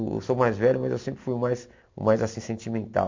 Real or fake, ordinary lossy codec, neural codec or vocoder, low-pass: real; MP3, 48 kbps; none; 7.2 kHz